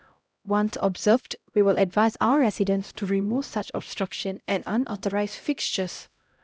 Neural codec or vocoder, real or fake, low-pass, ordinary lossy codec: codec, 16 kHz, 0.5 kbps, X-Codec, HuBERT features, trained on LibriSpeech; fake; none; none